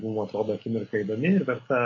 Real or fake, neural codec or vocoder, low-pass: real; none; 7.2 kHz